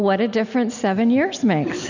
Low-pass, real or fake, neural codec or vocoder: 7.2 kHz; real; none